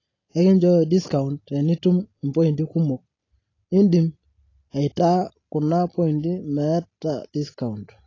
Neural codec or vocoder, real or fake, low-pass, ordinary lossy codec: none; real; 7.2 kHz; AAC, 32 kbps